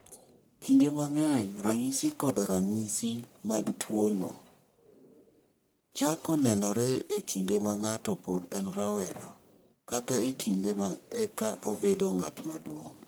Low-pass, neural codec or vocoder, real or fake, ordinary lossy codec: none; codec, 44.1 kHz, 1.7 kbps, Pupu-Codec; fake; none